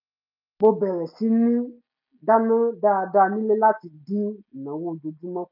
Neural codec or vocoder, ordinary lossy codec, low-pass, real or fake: none; none; 5.4 kHz; real